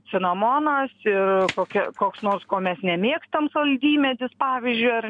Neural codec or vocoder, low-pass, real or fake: none; 9.9 kHz; real